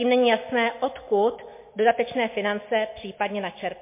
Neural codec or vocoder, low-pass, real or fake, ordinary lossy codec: none; 3.6 kHz; real; MP3, 24 kbps